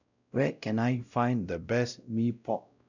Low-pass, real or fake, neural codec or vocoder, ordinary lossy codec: 7.2 kHz; fake; codec, 16 kHz, 0.5 kbps, X-Codec, WavLM features, trained on Multilingual LibriSpeech; none